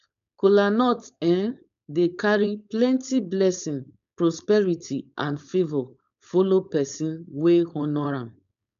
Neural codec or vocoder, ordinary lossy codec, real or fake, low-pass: codec, 16 kHz, 4.8 kbps, FACodec; none; fake; 7.2 kHz